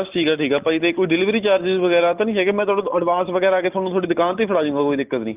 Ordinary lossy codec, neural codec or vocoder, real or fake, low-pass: Opus, 24 kbps; none; real; 3.6 kHz